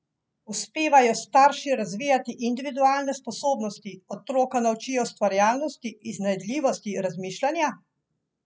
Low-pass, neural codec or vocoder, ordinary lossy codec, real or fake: none; none; none; real